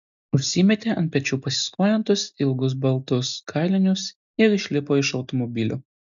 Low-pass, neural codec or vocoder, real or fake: 7.2 kHz; none; real